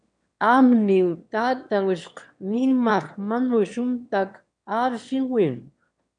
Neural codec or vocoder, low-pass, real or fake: autoencoder, 22.05 kHz, a latent of 192 numbers a frame, VITS, trained on one speaker; 9.9 kHz; fake